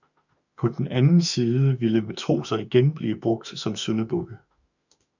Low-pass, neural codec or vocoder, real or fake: 7.2 kHz; autoencoder, 48 kHz, 32 numbers a frame, DAC-VAE, trained on Japanese speech; fake